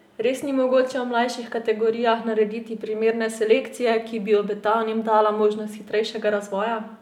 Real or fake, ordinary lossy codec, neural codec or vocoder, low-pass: real; none; none; 19.8 kHz